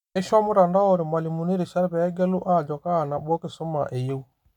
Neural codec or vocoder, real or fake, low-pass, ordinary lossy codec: none; real; 19.8 kHz; none